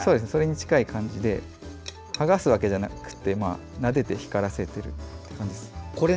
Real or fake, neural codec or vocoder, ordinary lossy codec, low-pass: real; none; none; none